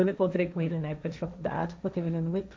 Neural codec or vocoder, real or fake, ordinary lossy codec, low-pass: codec, 16 kHz, 1.1 kbps, Voila-Tokenizer; fake; none; none